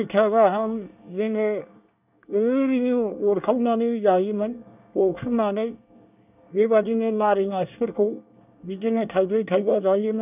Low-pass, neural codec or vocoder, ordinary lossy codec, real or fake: 3.6 kHz; codec, 24 kHz, 1 kbps, SNAC; none; fake